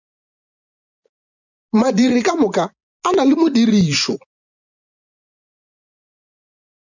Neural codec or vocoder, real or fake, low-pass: vocoder, 24 kHz, 100 mel bands, Vocos; fake; 7.2 kHz